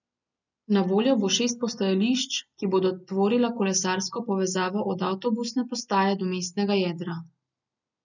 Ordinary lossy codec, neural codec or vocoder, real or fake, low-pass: none; none; real; 7.2 kHz